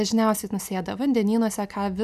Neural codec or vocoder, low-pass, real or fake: none; 14.4 kHz; real